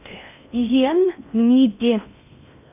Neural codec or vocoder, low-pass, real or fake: codec, 16 kHz in and 24 kHz out, 0.6 kbps, FocalCodec, streaming, 4096 codes; 3.6 kHz; fake